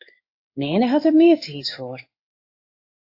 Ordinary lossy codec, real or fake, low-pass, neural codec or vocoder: AAC, 32 kbps; fake; 5.4 kHz; codec, 16 kHz in and 24 kHz out, 1 kbps, XY-Tokenizer